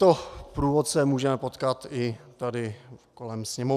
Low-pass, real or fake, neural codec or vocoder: 14.4 kHz; real; none